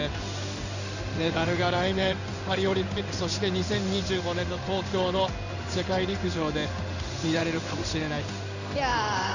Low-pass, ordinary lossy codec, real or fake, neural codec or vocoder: 7.2 kHz; none; fake; codec, 16 kHz in and 24 kHz out, 1 kbps, XY-Tokenizer